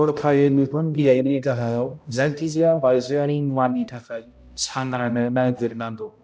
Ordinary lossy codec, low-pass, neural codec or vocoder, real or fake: none; none; codec, 16 kHz, 0.5 kbps, X-Codec, HuBERT features, trained on balanced general audio; fake